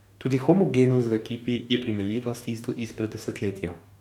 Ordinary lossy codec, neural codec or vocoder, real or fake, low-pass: none; codec, 44.1 kHz, 2.6 kbps, DAC; fake; 19.8 kHz